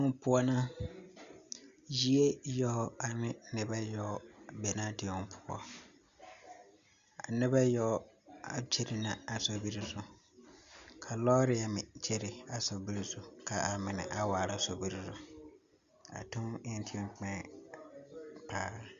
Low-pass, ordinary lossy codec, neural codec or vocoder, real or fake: 7.2 kHz; Opus, 64 kbps; none; real